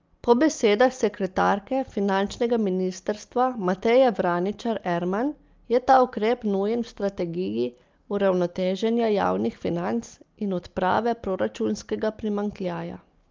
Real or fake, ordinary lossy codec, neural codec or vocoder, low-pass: real; Opus, 24 kbps; none; 7.2 kHz